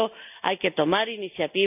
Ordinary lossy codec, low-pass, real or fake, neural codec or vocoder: none; 3.6 kHz; real; none